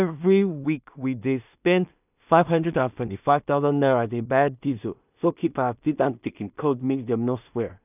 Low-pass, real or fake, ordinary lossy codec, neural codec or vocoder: 3.6 kHz; fake; none; codec, 16 kHz in and 24 kHz out, 0.4 kbps, LongCat-Audio-Codec, two codebook decoder